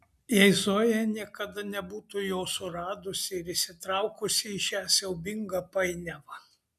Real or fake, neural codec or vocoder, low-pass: real; none; 14.4 kHz